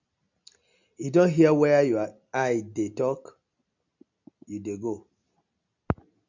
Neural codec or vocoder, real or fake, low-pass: none; real; 7.2 kHz